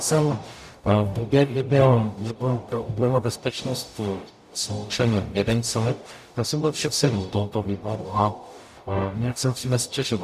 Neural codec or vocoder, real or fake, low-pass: codec, 44.1 kHz, 0.9 kbps, DAC; fake; 14.4 kHz